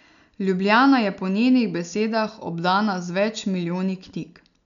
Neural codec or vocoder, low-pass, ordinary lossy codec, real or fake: none; 7.2 kHz; none; real